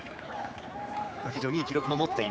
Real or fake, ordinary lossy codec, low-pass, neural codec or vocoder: fake; none; none; codec, 16 kHz, 4 kbps, X-Codec, HuBERT features, trained on general audio